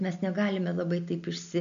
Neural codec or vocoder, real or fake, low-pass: none; real; 7.2 kHz